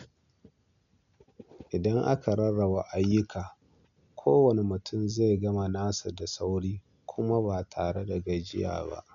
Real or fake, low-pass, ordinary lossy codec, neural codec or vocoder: real; 7.2 kHz; MP3, 96 kbps; none